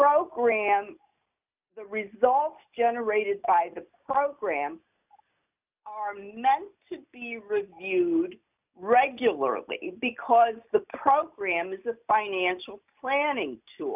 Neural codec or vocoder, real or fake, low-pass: none; real; 3.6 kHz